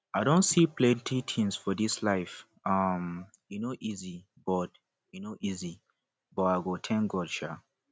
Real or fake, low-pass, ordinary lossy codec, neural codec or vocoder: real; none; none; none